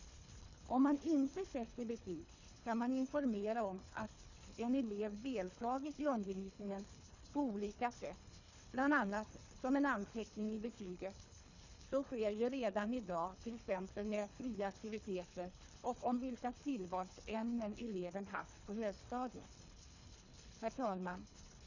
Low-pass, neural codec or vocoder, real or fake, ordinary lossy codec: 7.2 kHz; codec, 24 kHz, 3 kbps, HILCodec; fake; none